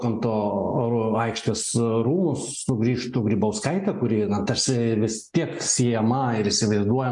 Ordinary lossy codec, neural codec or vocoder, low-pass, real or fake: MP3, 64 kbps; none; 10.8 kHz; real